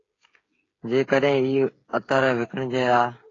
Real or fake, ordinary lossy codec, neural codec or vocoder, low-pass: fake; AAC, 32 kbps; codec, 16 kHz, 8 kbps, FreqCodec, smaller model; 7.2 kHz